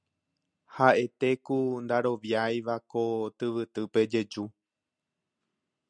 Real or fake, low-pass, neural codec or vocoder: real; 9.9 kHz; none